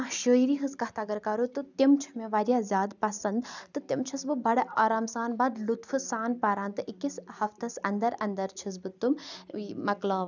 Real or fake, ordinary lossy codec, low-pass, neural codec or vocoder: real; none; 7.2 kHz; none